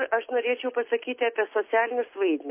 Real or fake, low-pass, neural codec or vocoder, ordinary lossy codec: real; 3.6 kHz; none; MP3, 24 kbps